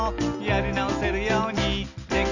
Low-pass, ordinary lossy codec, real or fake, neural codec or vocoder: 7.2 kHz; none; real; none